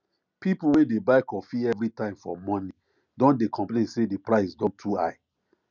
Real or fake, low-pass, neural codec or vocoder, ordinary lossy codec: real; 7.2 kHz; none; none